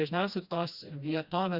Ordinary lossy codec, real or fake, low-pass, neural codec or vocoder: Opus, 64 kbps; fake; 5.4 kHz; codec, 16 kHz, 1 kbps, FreqCodec, smaller model